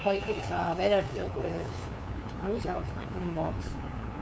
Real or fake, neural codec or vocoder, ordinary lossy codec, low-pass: fake; codec, 16 kHz, 2 kbps, FunCodec, trained on LibriTTS, 25 frames a second; none; none